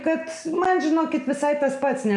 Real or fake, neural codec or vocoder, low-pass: real; none; 10.8 kHz